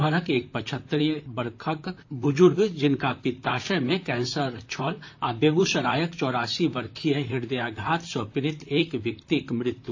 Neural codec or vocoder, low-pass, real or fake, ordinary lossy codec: vocoder, 44.1 kHz, 128 mel bands, Pupu-Vocoder; 7.2 kHz; fake; AAC, 48 kbps